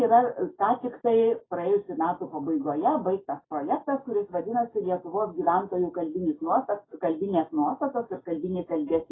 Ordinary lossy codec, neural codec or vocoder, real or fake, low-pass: AAC, 16 kbps; none; real; 7.2 kHz